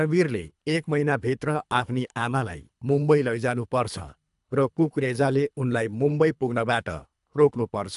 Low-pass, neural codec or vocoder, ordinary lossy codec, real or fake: 10.8 kHz; codec, 24 kHz, 3 kbps, HILCodec; AAC, 96 kbps; fake